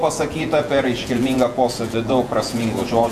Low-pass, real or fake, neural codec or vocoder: 14.4 kHz; fake; vocoder, 48 kHz, 128 mel bands, Vocos